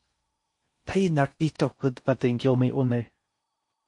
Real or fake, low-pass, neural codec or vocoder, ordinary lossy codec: fake; 10.8 kHz; codec, 16 kHz in and 24 kHz out, 0.6 kbps, FocalCodec, streaming, 4096 codes; MP3, 48 kbps